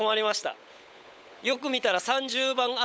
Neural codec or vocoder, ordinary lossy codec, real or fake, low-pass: codec, 16 kHz, 16 kbps, FunCodec, trained on LibriTTS, 50 frames a second; none; fake; none